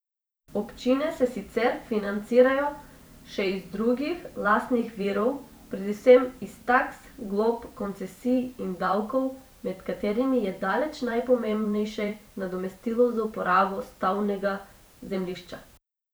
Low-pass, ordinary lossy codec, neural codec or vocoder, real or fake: none; none; vocoder, 44.1 kHz, 128 mel bands every 512 samples, BigVGAN v2; fake